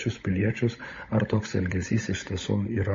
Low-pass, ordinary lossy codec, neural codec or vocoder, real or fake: 7.2 kHz; MP3, 32 kbps; codec, 16 kHz, 16 kbps, FunCodec, trained on LibriTTS, 50 frames a second; fake